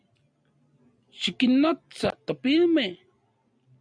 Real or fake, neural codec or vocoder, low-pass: real; none; 9.9 kHz